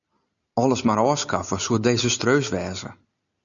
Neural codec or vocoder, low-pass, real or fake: none; 7.2 kHz; real